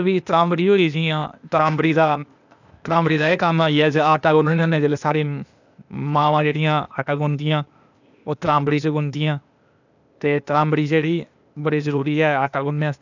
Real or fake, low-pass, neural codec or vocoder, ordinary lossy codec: fake; 7.2 kHz; codec, 16 kHz, 0.8 kbps, ZipCodec; none